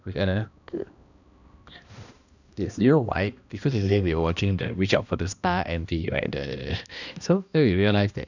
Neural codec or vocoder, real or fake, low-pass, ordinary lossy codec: codec, 16 kHz, 1 kbps, X-Codec, HuBERT features, trained on balanced general audio; fake; 7.2 kHz; none